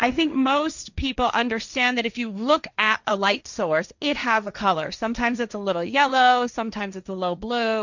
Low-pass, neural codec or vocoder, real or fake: 7.2 kHz; codec, 16 kHz, 1.1 kbps, Voila-Tokenizer; fake